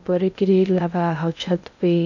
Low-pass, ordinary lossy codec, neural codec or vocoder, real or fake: 7.2 kHz; none; codec, 16 kHz in and 24 kHz out, 0.6 kbps, FocalCodec, streaming, 2048 codes; fake